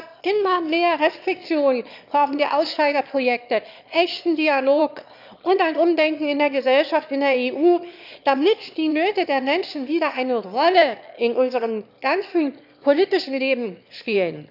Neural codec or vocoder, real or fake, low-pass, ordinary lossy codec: autoencoder, 22.05 kHz, a latent of 192 numbers a frame, VITS, trained on one speaker; fake; 5.4 kHz; none